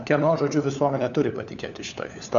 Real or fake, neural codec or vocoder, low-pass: fake; codec, 16 kHz, 4 kbps, FunCodec, trained on LibriTTS, 50 frames a second; 7.2 kHz